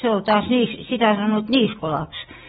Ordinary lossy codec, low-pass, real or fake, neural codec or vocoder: AAC, 16 kbps; 10.8 kHz; real; none